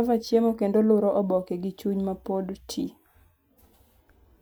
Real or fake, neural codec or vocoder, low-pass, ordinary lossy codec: real; none; none; none